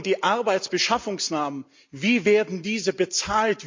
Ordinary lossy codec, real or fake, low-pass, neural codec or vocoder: MP3, 64 kbps; real; 7.2 kHz; none